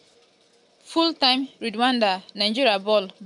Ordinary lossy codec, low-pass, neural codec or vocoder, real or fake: none; 10.8 kHz; none; real